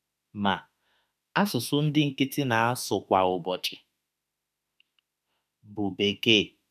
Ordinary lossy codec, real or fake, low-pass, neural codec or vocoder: none; fake; 14.4 kHz; autoencoder, 48 kHz, 32 numbers a frame, DAC-VAE, trained on Japanese speech